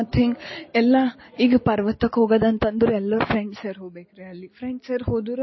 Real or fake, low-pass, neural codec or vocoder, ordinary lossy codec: real; 7.2 kHz; none; MP3, 24 kbps